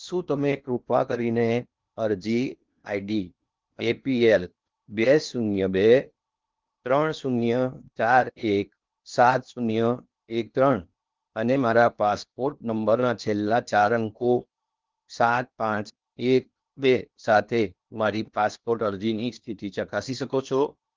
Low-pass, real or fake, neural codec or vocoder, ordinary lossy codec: 7.2 kHz; fake; codec, 16 kHz in and 24 kHz out, 0.8 kbps, FocalCodec, streaming, 65536 codes; Opus, 24 kbps